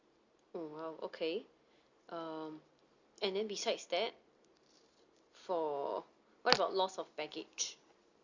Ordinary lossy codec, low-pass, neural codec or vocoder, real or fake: Opus, 64 kbps; 7.2 kHz; none; real